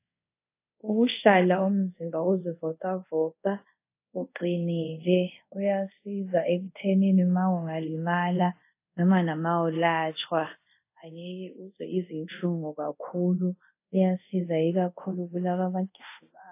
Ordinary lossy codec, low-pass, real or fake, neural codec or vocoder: AAC, 24 kbps; 3.6 kHz; fake; codec, 24 kHz, 0.5 kbps, DualCodec